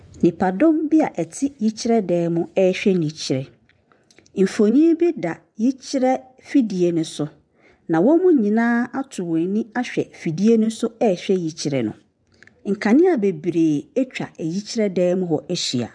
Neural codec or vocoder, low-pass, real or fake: vocoder, 24 kHz, 100 mel bands, Vocos; 9.9 kHz; fake